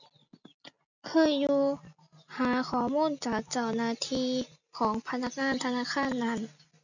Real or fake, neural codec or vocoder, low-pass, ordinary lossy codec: real; none; 7.2 kHz; none